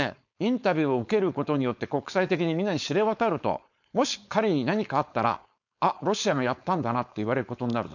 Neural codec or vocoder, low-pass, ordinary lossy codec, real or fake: codec, 16 kHz, 4.8 kbps, FACodec; 7.2 kHz; none; fake